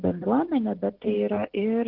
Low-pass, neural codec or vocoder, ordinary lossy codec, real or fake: 5.4 kHz; none; Opus, 16 kbps; real